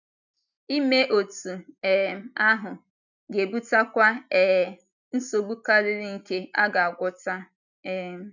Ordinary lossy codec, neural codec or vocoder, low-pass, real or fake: none; none; 7.2 kHz; real